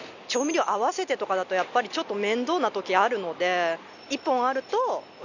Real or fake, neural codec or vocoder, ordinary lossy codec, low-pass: real; none; none; 7.2 kHz